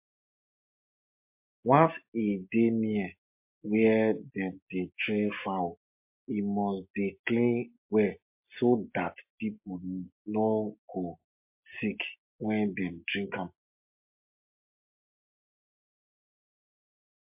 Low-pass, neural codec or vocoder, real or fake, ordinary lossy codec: 3.6 kHz; none; real; MP3, 32 kbps